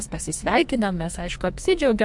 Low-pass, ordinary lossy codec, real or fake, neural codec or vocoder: 10.8 kHz; MP3, 64 kbps; fake; codec, 44.1 kHz, 2.6 kbps, SNAC